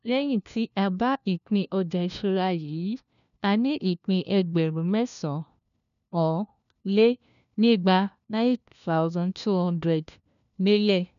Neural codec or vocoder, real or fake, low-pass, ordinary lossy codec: codec, 16 kHz, 1 kbps, FunCodec, trained on LibriTTS, 50 frames a second; fake; 7.2 kHz; none